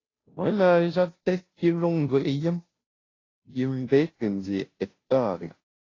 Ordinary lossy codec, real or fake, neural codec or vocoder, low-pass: AAC, 32 kbps; fake; codec, 16 kHz, 0.5 kbps, FunCodec, trained on Chinese and English, 25 frames a second; 7.2 kHz